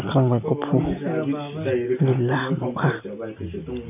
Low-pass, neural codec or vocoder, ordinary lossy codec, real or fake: 3.6 kHz; none; none; real